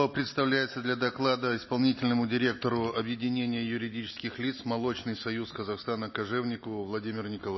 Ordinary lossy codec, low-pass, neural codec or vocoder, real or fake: MP3, 24 kbps; 7.2 kHz; none; real